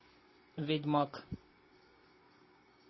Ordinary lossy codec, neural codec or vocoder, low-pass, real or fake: MP3, 24 kbps; none; 7.2 kHz; real